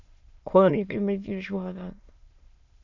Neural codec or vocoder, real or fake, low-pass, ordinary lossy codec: autoencoder, 22.05 kHz, a latent of 192 numbers a frame, VITS, trained on many speakers; fake; 7.2 kHz; AAC, 48 kbps